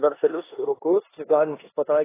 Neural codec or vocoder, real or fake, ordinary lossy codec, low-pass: codec, 16 kHz in and 24 kHz out, 0.9 kbps, LongCat-Audio-Codec, four codebook decoder; fake; AAC, 16 kbps; 3.6 kHz